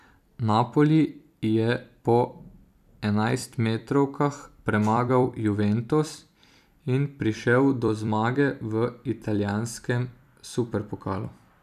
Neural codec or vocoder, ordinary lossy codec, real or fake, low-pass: vocoder, 44.1 kHz, 128 mel bands every 256 samples, BigVGAN v2; none; fake; 14.4 kHz